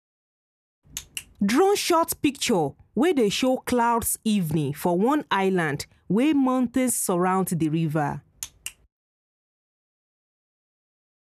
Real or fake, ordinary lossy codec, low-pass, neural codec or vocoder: real; none; 14.4 kHz; none